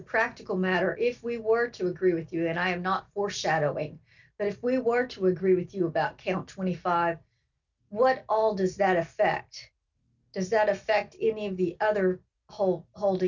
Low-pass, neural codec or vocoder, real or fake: 7.2 kHz; none; real